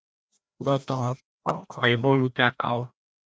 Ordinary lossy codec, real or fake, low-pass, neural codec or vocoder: none; fake; none; codec, 16 kHz, 1 kbps, FreqCodec, larger model